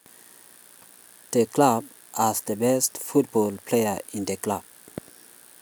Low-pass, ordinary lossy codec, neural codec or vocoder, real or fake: none; none; none; real